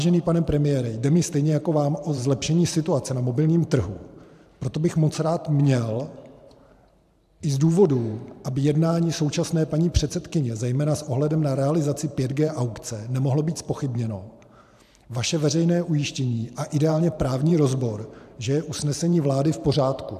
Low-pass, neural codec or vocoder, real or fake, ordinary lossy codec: 14.4 kHz; vocoder, 44.1 kHz, 128 mel bands every 512 samples, BigVGAN v2; fake; AAC, 96 kbps